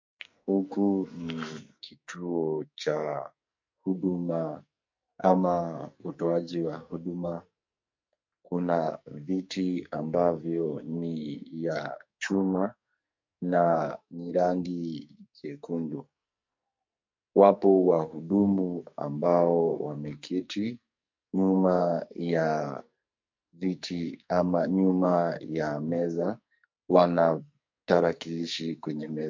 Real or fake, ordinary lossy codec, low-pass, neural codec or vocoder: fake; MP3, 48 kbps; 7.2 kHz; codec, 44.1 kHz, 2.6 kbps, SNAC